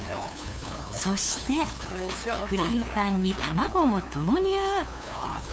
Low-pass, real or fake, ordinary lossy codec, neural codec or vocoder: none; fake; none; codec, 16 kHz, 2 kbps, FunCodec, trained on LibriTTS, 25 frames a second